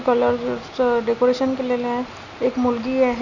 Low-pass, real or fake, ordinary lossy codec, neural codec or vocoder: 7.2 kHz; real; none; none